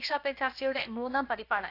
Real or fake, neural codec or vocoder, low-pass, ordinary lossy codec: fake; codec, 16 kHz, about 1 kbps, DyCAST, with the encoder's durations; 5.4 kHz; AAC, 32 kbps